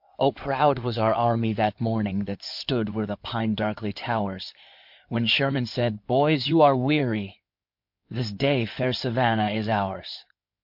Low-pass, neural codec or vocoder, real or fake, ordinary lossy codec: 5.4 kHz; codec, 16 kHz in and 24 kHz out, 2.2 kbps, FireRedTTS-2 codec; fake; MP3, 48 kbps